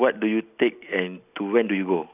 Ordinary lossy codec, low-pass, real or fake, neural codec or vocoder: none; 3.6 kHz; real; none